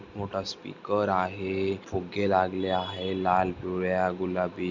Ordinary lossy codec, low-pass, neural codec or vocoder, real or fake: Opus, 64 kbps; 7.2 kHz; none; real